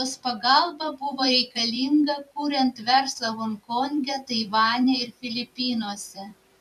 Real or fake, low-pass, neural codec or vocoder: fake; 14.4 kHz; vocoder, 44.1 kHz, 128 mel bands every 256 samples, BigVGAN v2